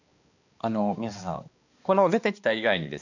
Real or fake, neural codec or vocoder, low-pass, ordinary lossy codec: fake; codec, 16 kHz, 2 kbps, X-Codec, HuBERT features, trained on balanced general audio; 7.2 kHz; none